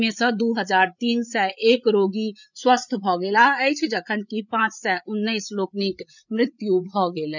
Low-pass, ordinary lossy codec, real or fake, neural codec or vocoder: 7.2 kHz; none; fake; codec, 16 kHz, 8 kbps, FreqCodec, larger model